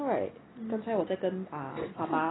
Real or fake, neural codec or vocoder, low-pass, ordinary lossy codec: real; none; 7.2 kHz; AAC, 16 kbps